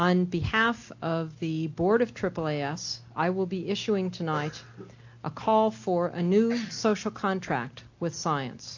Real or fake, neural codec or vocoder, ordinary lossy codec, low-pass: real; none; AAC, 48 kbps; 7.2 kHz